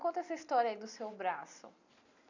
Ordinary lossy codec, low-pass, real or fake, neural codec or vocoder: none; 7.2 kHz; real; none